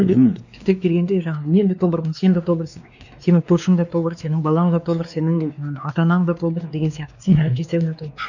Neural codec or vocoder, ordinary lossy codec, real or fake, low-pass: codec, 16 kHz, 2 kbps, X-Codec, WavLM features, trained on Multilingual LibriSpeech; none; fake; 7.2 kHz